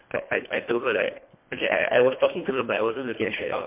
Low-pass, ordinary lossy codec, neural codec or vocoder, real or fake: 3.6 kHz; MP3, 32 kbps; codec, 24 kHz, 1.5 kbps, HILCodec; fake